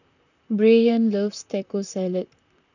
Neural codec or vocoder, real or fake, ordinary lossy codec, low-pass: vocoder, 44.1 kHz, 128 mel bands, Pupu-Vocoder; fake; none; 7.2 kHz